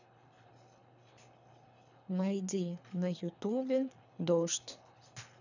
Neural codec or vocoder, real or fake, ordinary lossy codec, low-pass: codec, 24 kHz, 3 kbps, HILCodec; fake; none; 7.2 kHz